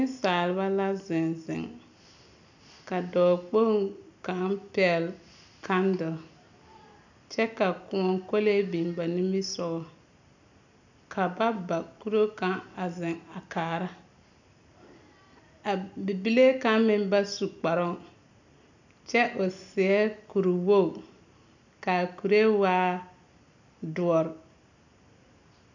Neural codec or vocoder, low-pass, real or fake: none; 7.2 kHz; real